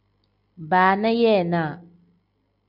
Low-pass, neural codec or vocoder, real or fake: 5.4 kHz; none; real